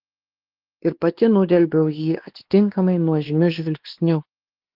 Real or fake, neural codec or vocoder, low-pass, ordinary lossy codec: fake; codec, 16 kHz, 2 kbps, X-Codec, WavLM features, trained on Multilingual LibriSpeech; 5.4 kHz; Opus, 16 kbps